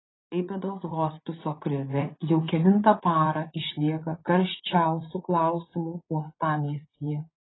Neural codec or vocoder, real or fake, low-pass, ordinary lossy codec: vocoder, 44.1 kHz, 80 mel bands, Vocos; fake; 7.2 kHz; AAC, 16 kbps